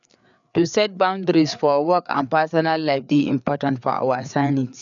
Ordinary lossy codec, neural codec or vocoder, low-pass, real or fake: none; codec, 16 kHz, 4 kbps, FreqCodec, larger model; 7.2 kHz; fake